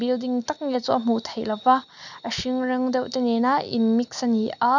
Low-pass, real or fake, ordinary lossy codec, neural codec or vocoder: 7.2 kHz; real; none; none